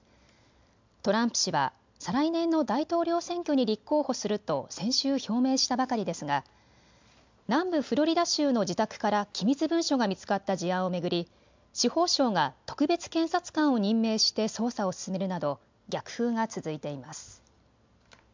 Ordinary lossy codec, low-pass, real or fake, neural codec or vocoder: MP3, 64 kbps; 7.2 kHz; real; none